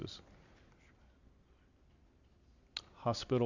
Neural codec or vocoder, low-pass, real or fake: none; 7.2 kHz; real